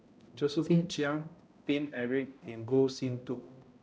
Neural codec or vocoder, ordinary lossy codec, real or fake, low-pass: codec, 16 kHz, 0.5 kbps, X-Codec, HuBERT features, trained on balanced general audio; none; fake; none